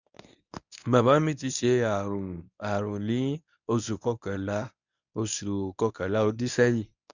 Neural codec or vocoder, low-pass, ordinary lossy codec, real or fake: codec, 24 kHz, 0.9 kbps, WavTokenizer, medium speech release version 1; 7.2 kHz; none; fake